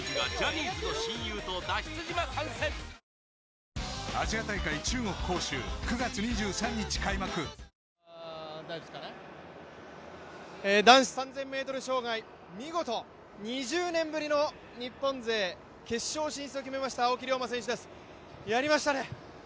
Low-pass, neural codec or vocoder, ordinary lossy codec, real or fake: none; none; none; real